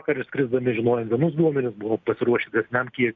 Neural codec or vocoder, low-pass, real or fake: none; 7.2 kHz; real